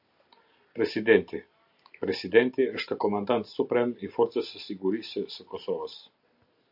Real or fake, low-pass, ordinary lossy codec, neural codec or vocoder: real; 5.4 kHz; AAC, 48 kbps; none